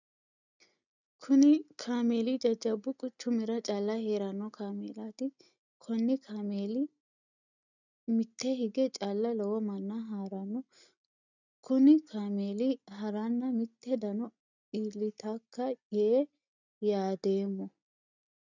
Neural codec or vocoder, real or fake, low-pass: none; real; 7.2 kHz